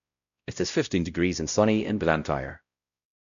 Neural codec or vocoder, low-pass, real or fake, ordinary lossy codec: codec, 16 kHz, 0.5 kbps, X-Codec, WavLM features, trained on Multilingual LibriSpeech; 7.2 kHz; fake; MP3, 96 kbps